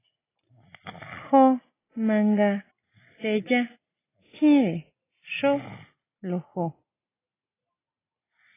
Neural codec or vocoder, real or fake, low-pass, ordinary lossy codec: none; real; 3.6 kHz; AAC, 16 kbps